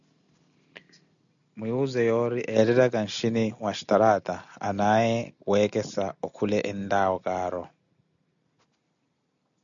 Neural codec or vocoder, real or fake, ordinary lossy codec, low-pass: none; real; MP3, 96 kbps; 7.2 kHz